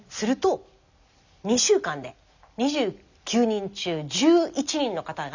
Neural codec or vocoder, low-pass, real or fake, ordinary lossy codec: none; 7.2 kHz; real; none